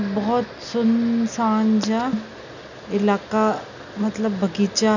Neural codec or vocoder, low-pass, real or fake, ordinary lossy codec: none; 7.2 kHz; real; none